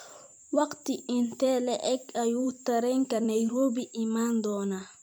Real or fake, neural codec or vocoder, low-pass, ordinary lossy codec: fake; vocoder, 44.1 kHz, 128 mel bands every 256 samples, BigVGAN v2; none; none